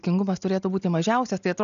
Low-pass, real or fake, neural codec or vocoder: 7.2 kHz; real; none